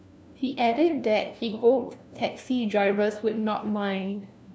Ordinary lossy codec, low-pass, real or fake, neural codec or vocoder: none; none; fake; codec, 16 kHz, 1 kbps, FunCodec, trained on LibriTTS, 50 frames a second